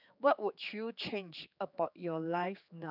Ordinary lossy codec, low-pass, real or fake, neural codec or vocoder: none; 5.4 kHz; fake; codec, 16 kHz, 4 kbps, X-Codec, HuBERT features, trained on general audio